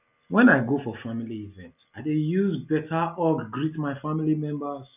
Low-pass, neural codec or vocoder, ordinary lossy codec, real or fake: 3.6 kHz; none; none; real